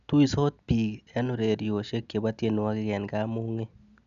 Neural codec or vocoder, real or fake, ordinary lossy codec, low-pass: none; real; none; 7.2 kHz